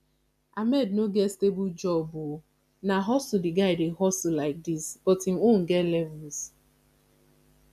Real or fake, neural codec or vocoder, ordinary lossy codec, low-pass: real; none; none; 14.4 kHz